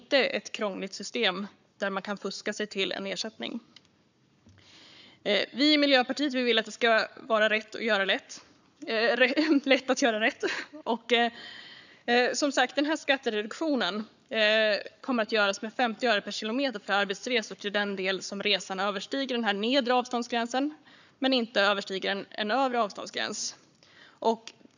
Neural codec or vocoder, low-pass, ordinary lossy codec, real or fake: codec, 44.1 kHz, 7.8 kbps, Pupu-Codec; 7.2 kHz; none; fake